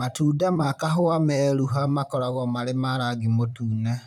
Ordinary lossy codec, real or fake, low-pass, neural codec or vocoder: none; fake; 19.8 kHz; vocoder, 44.1 kHz, 128 mel bands, Pupu-Vocoder